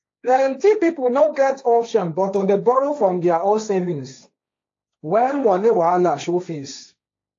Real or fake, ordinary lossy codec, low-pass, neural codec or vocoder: fake; AAC, 48 kbps; 7.2 kHz; codec, 16 kHz, 1.1 kbps, Voila-Tokenizer